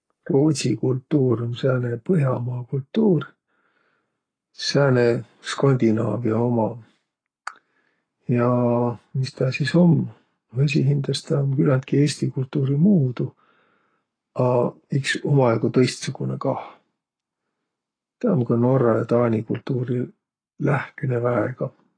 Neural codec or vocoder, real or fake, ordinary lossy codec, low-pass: codec, 44.1 kHz, 7.8 kbps, Pupu-Codec; fake; AAC, 32 kbps; 9.9 kHz